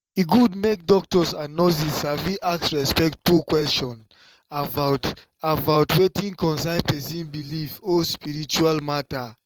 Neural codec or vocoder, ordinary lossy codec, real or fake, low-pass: vocoder, 44.1 kHz, 128 mel bands every 512 samples, BigVGAN v2; Opus, 24 kbps; fake; 19.8 kHz